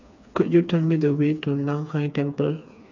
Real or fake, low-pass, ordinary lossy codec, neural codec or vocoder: fake; 7.2 kHz; none; codec, 16 kHz, 4 kbps, FreqCodec, smaller model